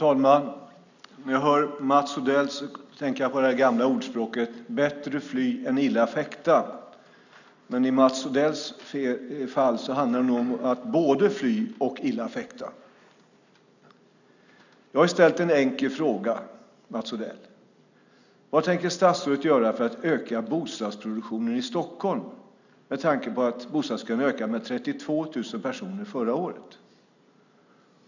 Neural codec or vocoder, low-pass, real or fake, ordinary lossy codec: none; 7.2 kHz; real; none